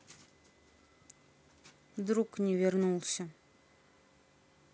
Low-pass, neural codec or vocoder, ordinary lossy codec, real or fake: none; none; none; real